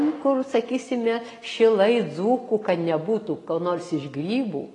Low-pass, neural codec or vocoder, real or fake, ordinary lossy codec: 10.8 kHz; none; real; AAC, 32 kbps